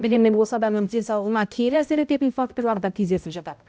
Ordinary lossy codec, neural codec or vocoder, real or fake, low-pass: none; codec, 16 kHz, 0.5 kbps, X-Codec, HuBERT features, trained on balanced general audio; fake; none